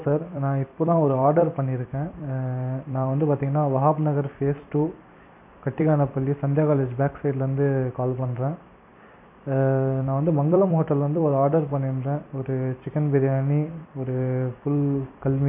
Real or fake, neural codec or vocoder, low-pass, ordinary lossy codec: fake; vocoder, 44.1 kHz, 128 mel bands every 256 samples, BigVGAN v2; 3.6 kHz; none